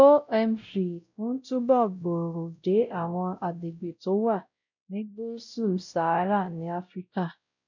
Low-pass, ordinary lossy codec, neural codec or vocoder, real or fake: 7.2 kHz; none; codec, 16 kHz, 0.5 kbps, X-Codec, WavLM features, trained on Multilingual LibriSpeech; fake